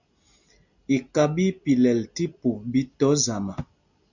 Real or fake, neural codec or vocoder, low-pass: real; none; 7.2 kHz